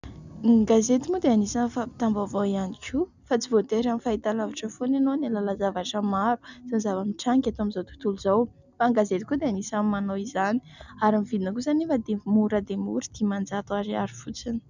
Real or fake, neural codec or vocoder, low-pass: real; none; 7.2 kHz